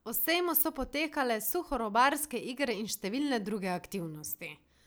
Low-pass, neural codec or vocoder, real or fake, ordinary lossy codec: none; none; real; none